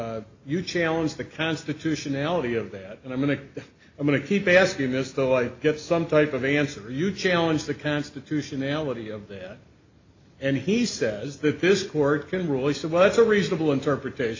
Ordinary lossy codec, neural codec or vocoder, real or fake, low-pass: AAC, 48 kbps; none; real; 7.2 kHz